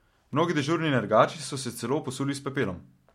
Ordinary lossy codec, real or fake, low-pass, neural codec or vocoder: MP3, 64 kbps; real; 19.8 kHz; none